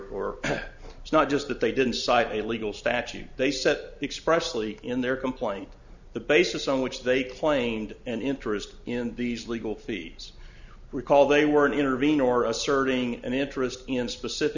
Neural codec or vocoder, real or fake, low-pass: none; real; 7.2 kHz